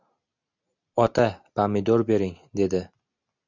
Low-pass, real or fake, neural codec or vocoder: 7.2 kHz; real; none